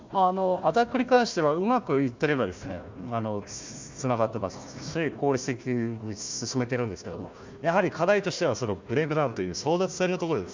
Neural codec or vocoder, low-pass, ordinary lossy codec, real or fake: codec, 16 kHz, 1 kbps, FunCodec, trained on Chinese and English, 50 frames a second; 7.2 kHz; MP3, 64 kbps; fake